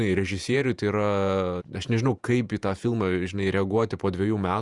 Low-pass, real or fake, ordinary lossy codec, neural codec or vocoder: 10.8 kHz; fake; Opus, 64 kbps; vocoder, 48 kHz, 128 mel bands, Vocos